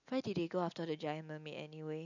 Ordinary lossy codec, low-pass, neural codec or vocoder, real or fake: none; 7.2 kHz; none; real